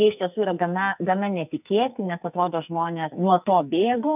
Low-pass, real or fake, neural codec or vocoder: 3.6 kHz; fake; codec, 44.1 kHz, 2.6 kbps, SNAC